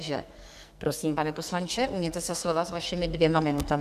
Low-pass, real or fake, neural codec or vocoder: 14.4 kHz; fake; codec, 44.1 kHz, 2.6 kbps, SNAC